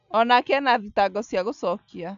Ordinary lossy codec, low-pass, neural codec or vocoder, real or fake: MP3, 96 kbps; 7.2 kHz; none; real